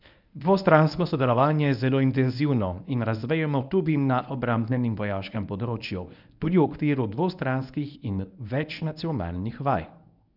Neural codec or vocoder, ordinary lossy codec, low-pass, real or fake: codec, 24 kHz, 0.9 kbps, WavTokenizer, medium speech release version 1; none; 5.4 kHz; fake